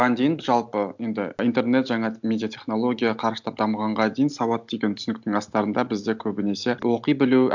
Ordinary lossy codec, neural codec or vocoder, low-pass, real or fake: none; none; 7.2 kHz; real